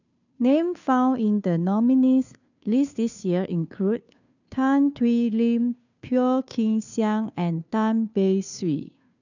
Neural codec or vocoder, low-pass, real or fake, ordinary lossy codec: codec, 16 kHz, 2 kbps, FunCodec, trained on Chinese and English, 25 frames a second; 7.2 kHz; fake; none